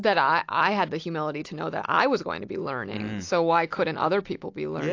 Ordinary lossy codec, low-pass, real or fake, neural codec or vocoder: AAC, 48 kbps; 7.2 kHz; real; none